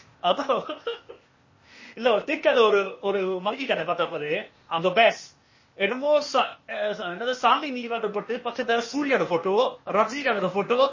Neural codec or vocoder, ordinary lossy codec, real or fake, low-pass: codec, 16 kHz, 0.8 kbps, ZipCodec; MP3, 32 kbps; fake; 7.2 kHz